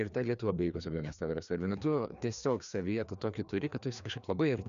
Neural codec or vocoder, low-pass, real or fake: codec, 16 kHz, 2 kbps, FreqCodec, larger model; 7.2 kHz; fake